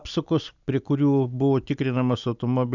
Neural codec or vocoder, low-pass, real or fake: none; 7.2 kHz; real